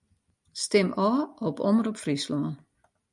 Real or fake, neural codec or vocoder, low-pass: real; none; 10.8 kHz